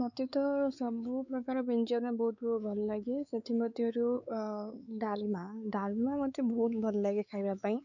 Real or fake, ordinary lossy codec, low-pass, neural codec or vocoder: fake; none; 7.2 kHz; codec, 16 kHz, 4 kbps, X-Codec, WavLM features, trained on Multilingual LibriSpeech